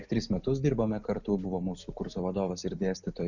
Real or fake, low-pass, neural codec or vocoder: real; 7.2 kHz; none